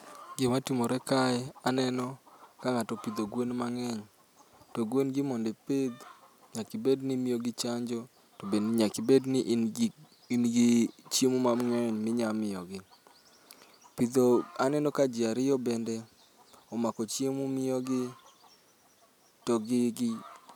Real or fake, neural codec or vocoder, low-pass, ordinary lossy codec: real; none; 19.8 kHz; none